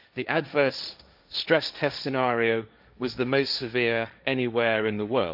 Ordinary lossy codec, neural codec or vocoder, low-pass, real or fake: none; codec, 16 kHz, 1.1 kbps, Voila-Tokenizer; 5.4 kHz; fake